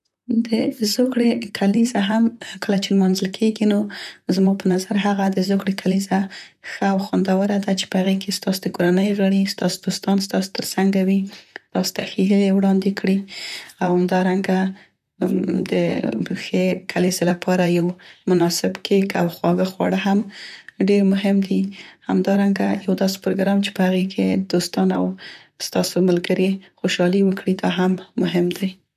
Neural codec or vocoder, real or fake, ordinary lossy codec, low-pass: vocoder, 44.1 kHz, 128 mel bands, Pupu-Vocoder; fake; none; 14.4 kHz